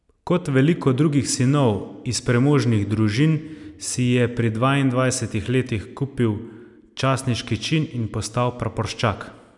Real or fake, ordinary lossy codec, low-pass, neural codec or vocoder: real; none; 10.8 kHz; none